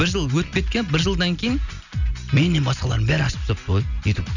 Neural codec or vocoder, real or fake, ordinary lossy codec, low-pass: none; real; none; 7.2 kHz